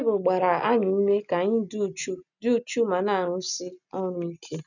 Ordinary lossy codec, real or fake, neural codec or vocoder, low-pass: none; real; none; 7.2 kHz